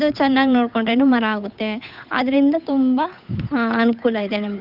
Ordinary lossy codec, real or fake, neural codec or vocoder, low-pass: none; fake; codec, 16 kHz, 8 kbps, FunCodec, trained on Chinese and English, 25 frames a second; 5.4 kHz